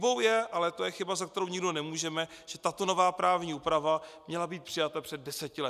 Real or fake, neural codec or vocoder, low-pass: real; none; 14.4 kHz